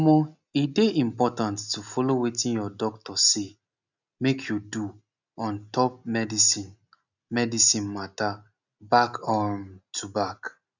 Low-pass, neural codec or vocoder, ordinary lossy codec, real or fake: 7.2 kHz; none; none; real